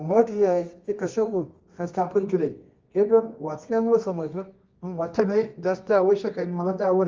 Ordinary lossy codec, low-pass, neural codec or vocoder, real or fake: Opus, 32 kbps; 7.2 kHz; codec, 24 kHz, 0.9 kbps, WavTokenizer, medium music audio release; fake